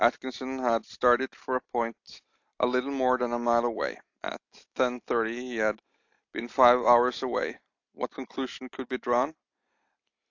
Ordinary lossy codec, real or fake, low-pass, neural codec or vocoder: AAC, 48 kbps; real; 7.2 kHz; none